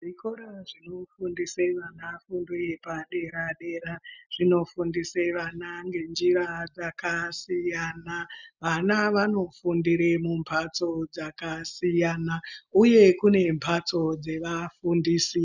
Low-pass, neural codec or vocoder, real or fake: 7.2 kHz; none; real